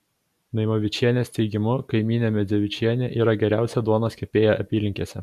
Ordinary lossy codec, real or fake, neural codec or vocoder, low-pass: MP3, 96 kbps; real; none; 14.4 kHz